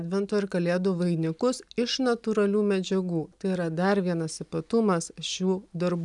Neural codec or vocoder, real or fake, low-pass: none; real; 10.8 kHz